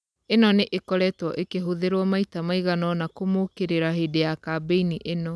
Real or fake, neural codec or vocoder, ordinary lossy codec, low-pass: real; none; none; none